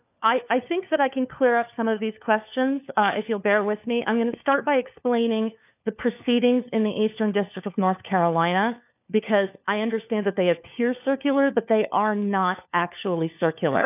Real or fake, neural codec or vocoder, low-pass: fake; codec, 16 kHz, 4 kbps, FunCodec, trained on LibriTTS, 50 frames a second; 3.6 kHz